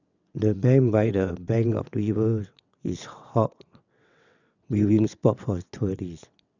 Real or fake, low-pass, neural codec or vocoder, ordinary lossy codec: fake; 7.2 kHz; vocoder, 22.05 kHz, 80 mel bands, WaveNeXt; none